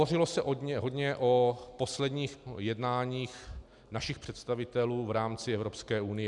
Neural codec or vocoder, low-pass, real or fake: none; 10.8 kHz; real